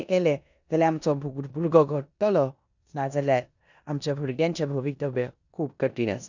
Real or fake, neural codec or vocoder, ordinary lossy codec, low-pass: fake; codec, 16 kHz in and 24 kHz out, 0.9 kbps, LongCat-Audio-Codec, four codebook decoder; none; 7.2 kHz